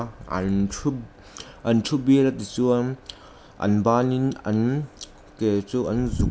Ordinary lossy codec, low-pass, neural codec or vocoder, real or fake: none; none; none; real